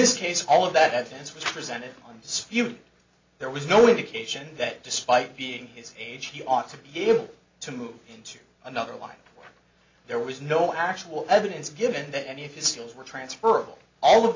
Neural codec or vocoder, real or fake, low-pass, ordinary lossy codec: none; real; 7.2 kHz; MP3, 64 kbps